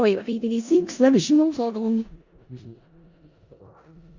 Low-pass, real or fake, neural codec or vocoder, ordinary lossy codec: 7.2 kHz; fake; codec, 16 kHz in and 24 kHz out, 0.4 kbps, LongCat-Audio-Codec, four codebook decoder; Opus, 64 kbps